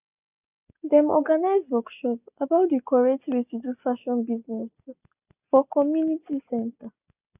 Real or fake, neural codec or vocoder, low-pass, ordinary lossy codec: real; none; 3.6 kHz; none